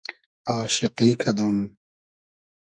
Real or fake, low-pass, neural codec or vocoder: fake; 9.9 kHz; codec, 44.1 kHz, 2.6 kbps, SNAC